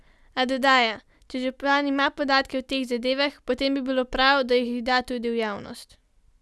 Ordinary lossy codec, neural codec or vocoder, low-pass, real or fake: none; none; none; real